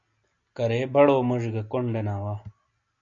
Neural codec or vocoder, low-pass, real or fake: none; 7.2 kHz; real